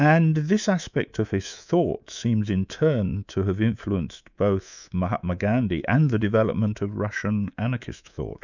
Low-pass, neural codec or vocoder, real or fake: 7.2 kHz; codec, 24 kHz, 3.1 kbps, DualCodec; fake